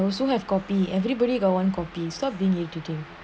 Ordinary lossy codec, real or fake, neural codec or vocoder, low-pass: none; real; none; none